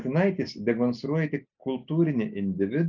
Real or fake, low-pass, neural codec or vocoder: real; 7.2 kHz; none